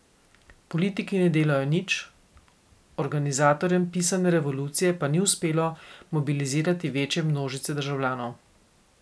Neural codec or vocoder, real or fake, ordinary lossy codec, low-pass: none; real; none; none